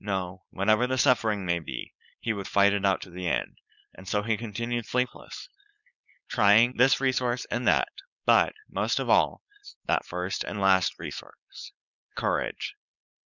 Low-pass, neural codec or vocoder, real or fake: 7.2 kHz; codec, 16 kHz, 4.8 kbps, FACodec; fake